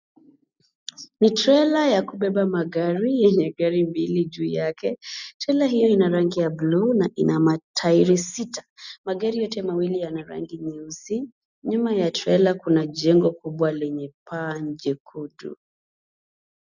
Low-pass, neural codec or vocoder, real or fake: 7.2 kHz; none; real